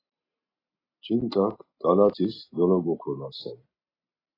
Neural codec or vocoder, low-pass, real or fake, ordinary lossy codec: none; 5.4 kHz; real; AAC, 24 kbps